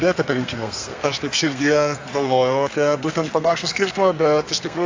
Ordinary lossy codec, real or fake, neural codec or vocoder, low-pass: AAC, 48 kbps; fake; codec, 32 kHz, 1.9 kbps, SNAC; 7.2 kHz